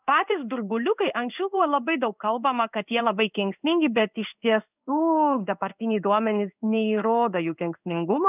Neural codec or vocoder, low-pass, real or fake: codec, 16 kHz in and 24 kHz out, 1 kbps, XY-Tokenizer; 3.6 kHz; fake